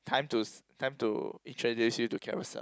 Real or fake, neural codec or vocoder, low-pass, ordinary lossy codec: real; none; none; none